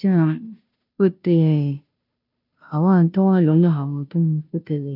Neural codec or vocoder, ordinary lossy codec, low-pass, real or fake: codec, 16 kHz, 0.5 kbps, FunCodec, trained on Chinese and English, 25 frames a second; none; 5.4 kHz; fake